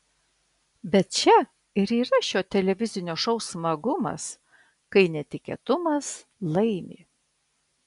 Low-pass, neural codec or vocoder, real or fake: 10.8 kHz; none; real